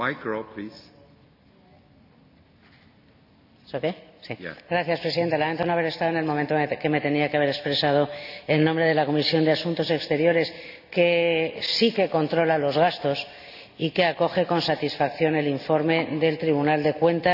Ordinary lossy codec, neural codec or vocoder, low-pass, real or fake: none; none; 5.4 kHz; real